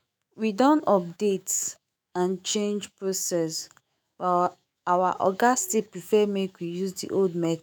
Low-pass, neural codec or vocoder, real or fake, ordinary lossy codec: none; autoencoder, 48 kHz, 128 numbers a frame, DAC-VAE, trained on Japanese speech; fake; none